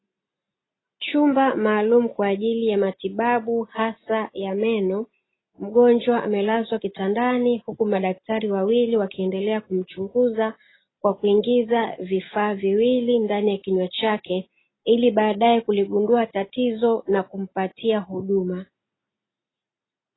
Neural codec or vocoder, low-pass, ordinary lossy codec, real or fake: none; 7.2 kHz; AAC, 16 kbps; real